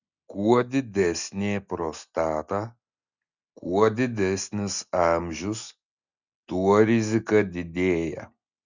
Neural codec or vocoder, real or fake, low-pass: none; real; 7.2 kHz